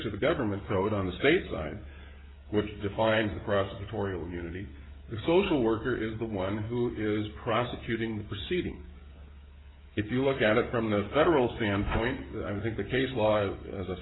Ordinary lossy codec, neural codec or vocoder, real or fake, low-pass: AAC, 16 kbps; codec, 16 kHz, 16 kbps, FreqCodec, smaller model; fake; 7.2 kHz